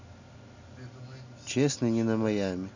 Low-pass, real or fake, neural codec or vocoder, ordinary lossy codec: 7.2 kHz; real; none; none